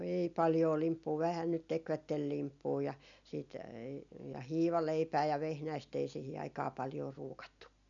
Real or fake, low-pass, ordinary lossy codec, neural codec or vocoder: real; 7.2 kHz; none; none